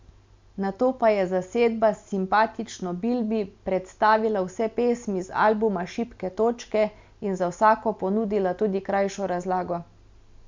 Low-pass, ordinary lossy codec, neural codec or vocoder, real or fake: 7.2 kHz; MP3, 64 kbps; none; real